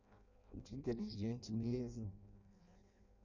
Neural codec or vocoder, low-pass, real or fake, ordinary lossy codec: codec, 16 kHz in and 24 kHz out, 0.6 kbps, FireRedTTS-2 codec; 7.2 kHz; fake; none